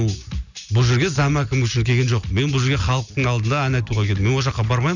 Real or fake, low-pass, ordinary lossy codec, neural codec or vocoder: real; 7.2 kHz; none; none